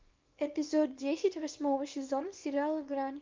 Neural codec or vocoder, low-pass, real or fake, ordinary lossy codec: codec, 24 kHz, 0.9 kbps, WavTokenizer, small release; 7.2 kHz; fake; Opus, 24 kbps